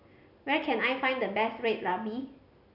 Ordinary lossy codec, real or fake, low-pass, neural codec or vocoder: none; real; 5.4 kHz; none